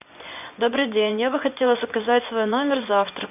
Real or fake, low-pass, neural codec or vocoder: fake; 3.6 kHz; vocoder, 24 kHz, 100 mel bands, Vocos